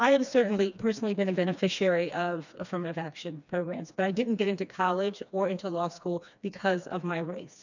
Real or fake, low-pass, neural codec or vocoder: fake; 7.2 kHz; codec, 16 kHz, 2 kbps, FreqCodec, smaller model